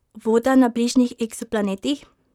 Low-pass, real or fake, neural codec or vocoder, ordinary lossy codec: 19.8 kHz; fake; vocoder, 44.1 kHz, 128 mel bands, Pupu-Vocoder; none